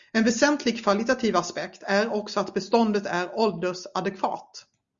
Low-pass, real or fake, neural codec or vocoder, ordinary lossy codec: 7.2 kHz; real; none; Opus, 64 kbps